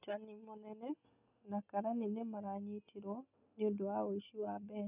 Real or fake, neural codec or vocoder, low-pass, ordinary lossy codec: real; none; 3.6 kHz; none